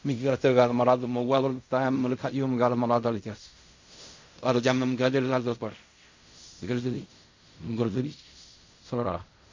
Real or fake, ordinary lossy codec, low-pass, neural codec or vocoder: fake; MP3, 48 kbps; 7.2 kHz; codec, 16 kHz in and 24 kHz out, 0.4 kbps, LongCat-Audio-Codec, fine tuned four codebook decoder